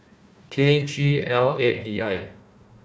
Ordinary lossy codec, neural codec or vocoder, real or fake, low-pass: none; codec, 16 kHz, 1 kbps, FunCodec, trained on Chinese and English, 50 frames a second; fake; none